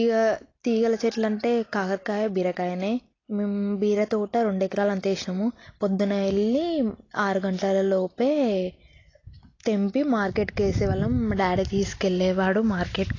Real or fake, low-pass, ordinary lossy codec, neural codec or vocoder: real; 7.2 kHz; AAC, 32 kbps; none